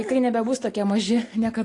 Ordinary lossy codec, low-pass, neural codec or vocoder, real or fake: AAC, 48 kbps; 10.8 kHz; none; real